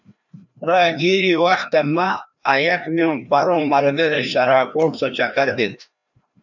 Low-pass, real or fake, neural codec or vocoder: 7.2 kHz; fake; codec, 16 kHz, 1 kbps, FreqCodec, larger model